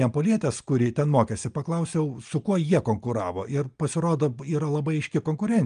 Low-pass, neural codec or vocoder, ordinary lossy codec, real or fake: 9.9 kHz; none; Opus, 32 kbps; real